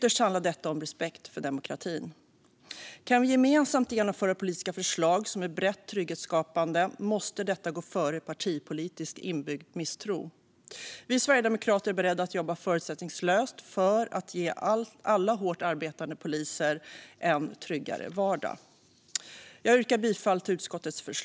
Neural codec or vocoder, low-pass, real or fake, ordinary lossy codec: none; none; real; none